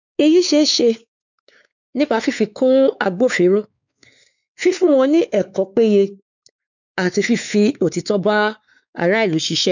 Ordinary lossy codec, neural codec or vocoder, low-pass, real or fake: none; codec, 16 kHz, 4 kbps, X-Codec, WavLM features, trained on Multilingual LibriSpeech; 7.2 kHz; fake